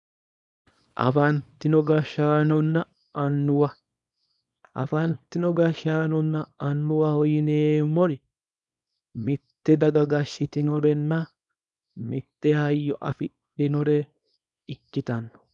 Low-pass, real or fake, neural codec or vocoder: 10.8 kHz; fake; codec, 24 kHz, 0.9 kbps, WavTokenizer, small release